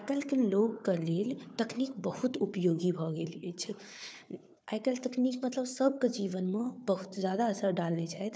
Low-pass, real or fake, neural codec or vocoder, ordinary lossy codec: none; fake; codec, 16 kHz, 4 kbps, FunCodec, trained on Chinese and English, 50 frames a second; none